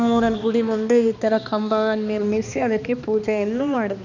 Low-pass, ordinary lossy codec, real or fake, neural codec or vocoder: 7.2 kHz; none; fake; codec, 16 kHz, 2 kbps, X-Codec, HuBERT features, trained on balanced general audio